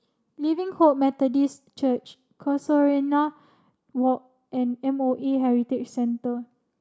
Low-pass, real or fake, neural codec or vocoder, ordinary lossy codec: none; real; none; none